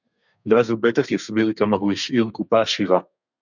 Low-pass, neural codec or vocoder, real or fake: 7.2 kHz; codec, 32 kHz, 1.9 kbps, SNAC; fake